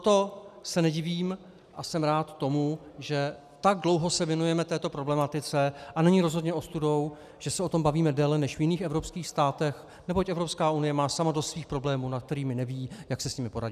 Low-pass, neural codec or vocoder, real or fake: 14.4 kHz; none; real